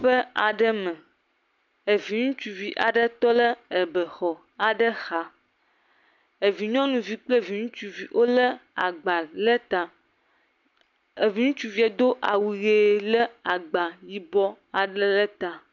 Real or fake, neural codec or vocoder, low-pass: real; none; 7.2 kHz